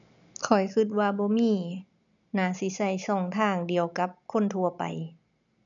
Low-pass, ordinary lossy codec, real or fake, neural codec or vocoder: 7.2 kHz; none; real; none